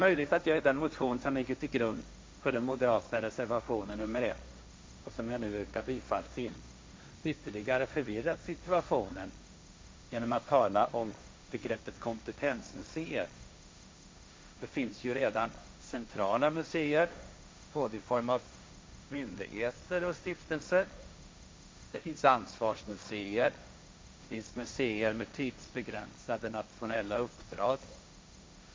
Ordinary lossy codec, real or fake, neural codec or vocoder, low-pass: none; fake; codec, 16 kHz, 1.1 kbps, Voila-Tokenizer; none